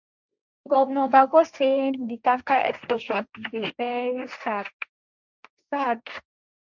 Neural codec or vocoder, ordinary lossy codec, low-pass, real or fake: codec, 16 kHz, 1.1 kbps, Voila-Tokenizer; none; 7.2 kHz; fake